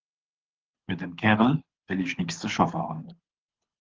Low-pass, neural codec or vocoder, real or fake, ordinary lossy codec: 7.2 kHz; codec, 24 kHz, 6 kbps, HILCodec; fake; Opus, 16 kbps